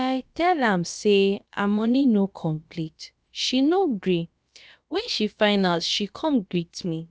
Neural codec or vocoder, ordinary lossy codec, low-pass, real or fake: codec, 16 kHz, about 1 kbps, DyCAST, with the encoder's durations; none; none; fake